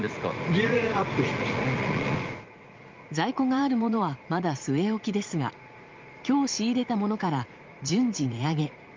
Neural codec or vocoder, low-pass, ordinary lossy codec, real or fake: vocoder, 44.1 kHz, 80 mel bands, Vocos; 7.2 kHz; Opus, 32 kbps; fake